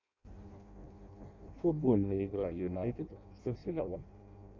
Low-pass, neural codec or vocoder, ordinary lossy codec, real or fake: 7.2 kHz; codec, 16 kHz in and 24 kHz out, 0.6 kbps, FireRedTTS-2 codec; MP3, 64 kbps; fake